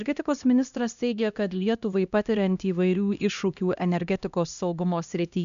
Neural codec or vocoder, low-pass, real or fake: codec, 16 kHz, 1 kbps, X-Codec, HuBERT features, trained on LibriSpeech; 7.2 kHz; fake